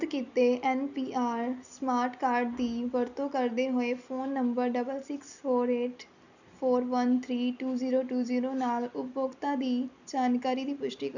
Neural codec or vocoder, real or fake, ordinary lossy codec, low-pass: none; real; none; 7.2 kHz